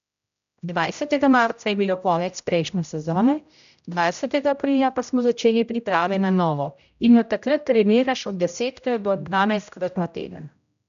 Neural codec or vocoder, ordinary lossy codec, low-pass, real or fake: codec, 16 kHz, 0.5 kbps, X-Codec, HuBERT features, trained on general audio; none; 7.2 kHz; fake